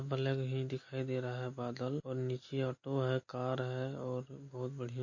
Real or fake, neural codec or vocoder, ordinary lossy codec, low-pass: real; none; MP3, 32 kbps; 7.2 kHz